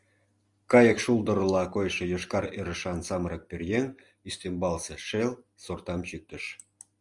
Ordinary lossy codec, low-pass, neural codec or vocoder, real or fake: Opus, 64 kbps; 10.8 kHz; none; real